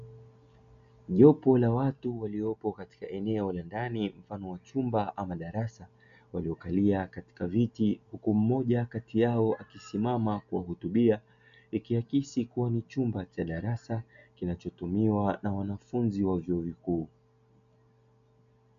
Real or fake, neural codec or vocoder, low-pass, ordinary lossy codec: real; none; 7.2 kHz; MP3, 96 kbps